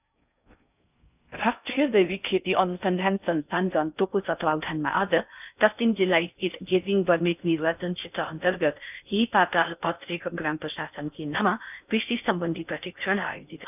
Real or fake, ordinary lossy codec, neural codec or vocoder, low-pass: fake; none; codec, 16 kHz in and 24 kHz out, 0.6 kbps, FocalCodec, streaming, 2048 codes; 3.6 kHz